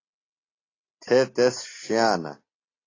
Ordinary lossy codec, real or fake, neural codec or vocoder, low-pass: AAC, 32 kbps; real; none; 7.2 kHz